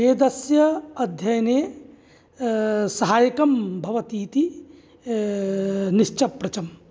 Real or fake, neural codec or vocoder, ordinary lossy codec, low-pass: real; none; none; none